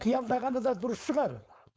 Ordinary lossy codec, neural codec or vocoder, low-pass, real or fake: none; codec, 16 kHz, 4.8 kbps, FACodec; none; fake